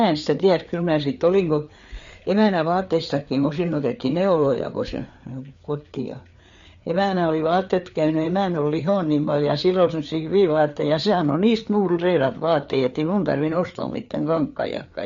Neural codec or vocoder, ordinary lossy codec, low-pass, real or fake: codec, 16 kHz, 4 kbps, FreqCodec, larger model; MP3, 48 kbps; 7.2 kHz; fake